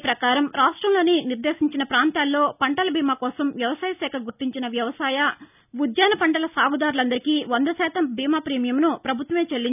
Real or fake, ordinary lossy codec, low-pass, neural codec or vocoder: real; none; 3.6 kHz; none